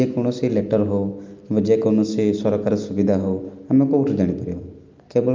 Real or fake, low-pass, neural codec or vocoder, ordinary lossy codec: real; 7.2 kHz; none; Opus, 24 kbps